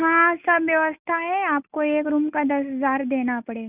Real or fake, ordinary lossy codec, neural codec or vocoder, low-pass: real; none; none; 3.6 kHz